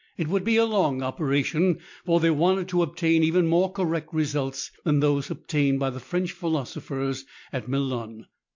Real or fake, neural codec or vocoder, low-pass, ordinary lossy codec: real; none; 7.2 kHz; MP3, 48 kbps